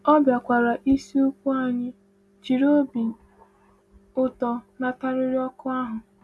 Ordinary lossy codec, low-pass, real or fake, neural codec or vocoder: none; none; real; none